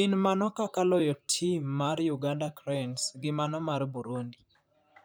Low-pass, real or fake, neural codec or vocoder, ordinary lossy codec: none; fake; vocoder, 44.1 kHz, 128 mel bands, Pupu-Vocoder; none